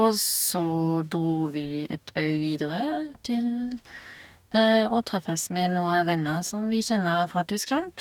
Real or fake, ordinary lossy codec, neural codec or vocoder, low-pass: fake; none; codec, 44.1 kHz, 2.6 kbps, DAC; 19.8 kHz